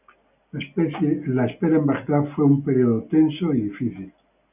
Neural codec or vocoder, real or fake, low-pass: none; real; 3.6 kHz